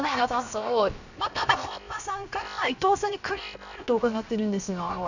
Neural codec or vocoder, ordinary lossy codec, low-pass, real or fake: codec, 16 kHz, 0.7 kbps, FocalCodec; none; 7.2 kHz; fake